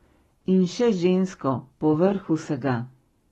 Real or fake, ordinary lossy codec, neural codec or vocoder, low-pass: fake; AAC, 32 kbps; codec, 44.1 kHz, 7.8 kbps, Pupu-Codec; 19.8 kHz